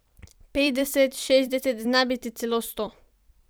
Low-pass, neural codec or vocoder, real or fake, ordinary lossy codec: none; vocoder, 44.1 kHz, 128 mel bands every 256 samples, BigVGAN v2; fake; none